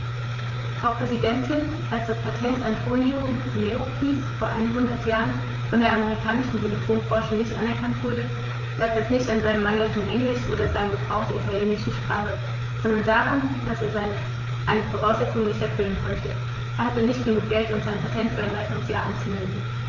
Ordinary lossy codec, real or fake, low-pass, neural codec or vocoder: none; fake; 7.2 kHz; codec, 16 kHz, 4 kbps, FreqCodec, larger model